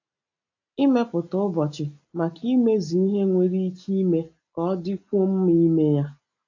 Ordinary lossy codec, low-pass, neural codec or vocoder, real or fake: AAC, 48 kbps; 7.2 kHz; none; real